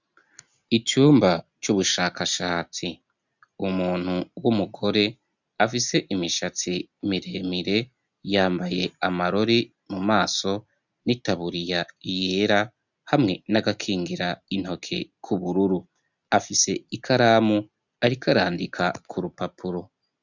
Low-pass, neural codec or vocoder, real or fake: 7.2 kHz; none; real